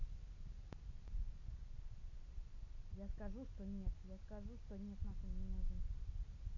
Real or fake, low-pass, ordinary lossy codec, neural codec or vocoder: real; 7.2 kHz; none; none